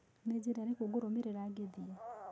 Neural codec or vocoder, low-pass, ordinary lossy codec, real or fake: none; none; none; real